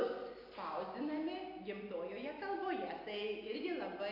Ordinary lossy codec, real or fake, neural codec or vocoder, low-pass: AAC, 32 kbps; real; none; 5.4 kHz